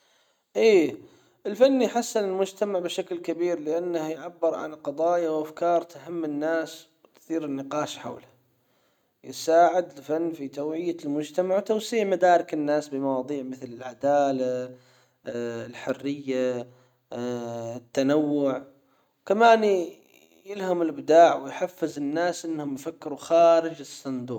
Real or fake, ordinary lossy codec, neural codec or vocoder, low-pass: real; none; none; 19.8 kHz